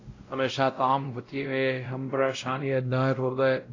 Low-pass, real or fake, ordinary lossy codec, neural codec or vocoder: 7.2 kHz; fake; AAC, 32 kbps; codec, 16 kHz, 0.5 kbps, X-Codec, WavLM features, trained on Multilingual LibriSpeech